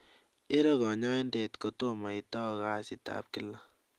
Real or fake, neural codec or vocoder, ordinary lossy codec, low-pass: real; none; Opus, 24 kbps; 14.4 kHz